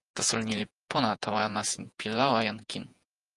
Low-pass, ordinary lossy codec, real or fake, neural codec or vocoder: 10.8 kHz; Opus, 32 kbps; fake; vocoder, 48 kHz, 128 mel bands, Vocos